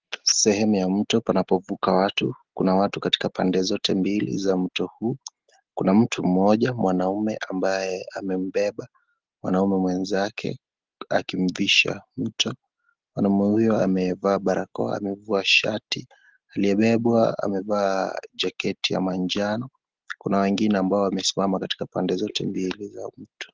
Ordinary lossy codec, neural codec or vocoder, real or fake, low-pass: Opus, 16 kbps; none; real; 7.2 kHz